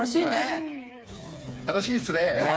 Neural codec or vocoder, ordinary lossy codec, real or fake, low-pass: codec, 16 kHz, 4 kbps, FreqCodec, smaller model; none; fake; none